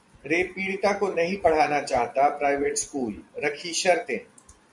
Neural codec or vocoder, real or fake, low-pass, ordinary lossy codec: vocoder, 24 kHz, 100 mel bands, Vocos; fake; 10.8 kHz; MP3, 96 kbps